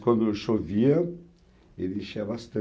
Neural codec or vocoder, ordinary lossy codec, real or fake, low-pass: none; none; real; none